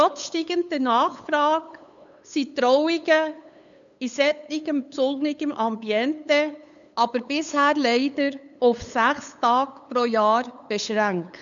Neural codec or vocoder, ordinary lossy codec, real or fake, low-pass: codec, 16 kHz, 8 kbps, FunCodec, trained on LibriTTS, 25 frames a second; none; fake; 7.2 kHz